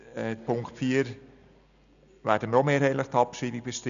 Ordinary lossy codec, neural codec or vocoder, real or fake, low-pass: none; none; real; 7.2 kHz